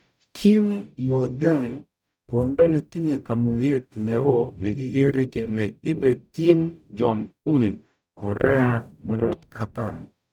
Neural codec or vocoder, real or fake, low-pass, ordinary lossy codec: codec, 44.1 kHz, 0.9 kbps, DAC; fake; 19.8 kHz; none